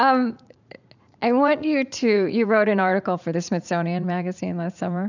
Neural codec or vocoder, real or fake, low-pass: vocoder, 44.1 kHz, 80 mel bands, Vocos; fake; 7.2 kHz